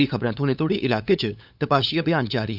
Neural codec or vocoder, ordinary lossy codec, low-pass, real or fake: codec, 16 kHz, 8 kbps, FunCodec, trained on LibriTTS, 25 frames a second; none; 5.4 kHz; fake